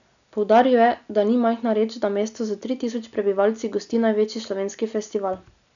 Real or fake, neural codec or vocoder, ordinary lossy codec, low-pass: real; none; none; 7.2 kHz